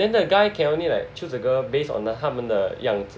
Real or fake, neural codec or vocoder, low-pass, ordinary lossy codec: real; none; none; none